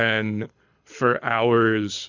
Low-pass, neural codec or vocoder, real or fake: 7.2 kHz; codec, 24 kHz, 6 kbps, HILCodec; fake